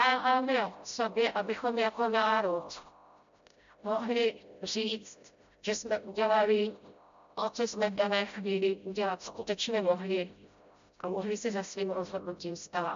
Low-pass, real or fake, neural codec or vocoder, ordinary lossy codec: 7.2 kHz; fake; codec, 16 kHz, 0.5 kbps, FreqCodec, smaller model; MP3, 64 kbps